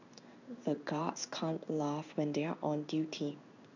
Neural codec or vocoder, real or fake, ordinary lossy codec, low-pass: codec, 16 kHz in and 24 kHz out, 1 kbps, XY-Tokenizer; fake; none; 7.2 kHz